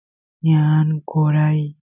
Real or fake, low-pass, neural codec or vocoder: real; 3.6 kHz; none